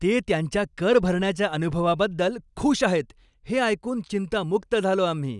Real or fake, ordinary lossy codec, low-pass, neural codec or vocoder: real; none; 10.8 kHz; none